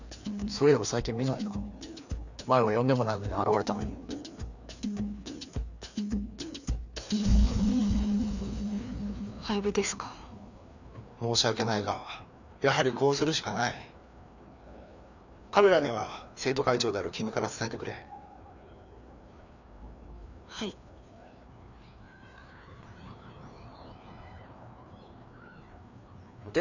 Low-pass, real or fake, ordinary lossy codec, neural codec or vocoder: 7.2 kHz; fake; none; codec, 16 kHz, 2 kbps, FreqCodec, larger model